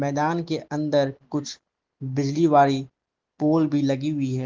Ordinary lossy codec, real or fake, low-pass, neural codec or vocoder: Opus, 16 kbps; real; 7.2 kHz; none